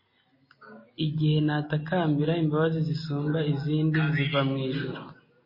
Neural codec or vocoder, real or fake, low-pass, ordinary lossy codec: none; real; 5.4 kHz; MP3, 24 kbps